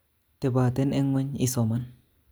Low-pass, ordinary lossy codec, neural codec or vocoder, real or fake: none; none; none; real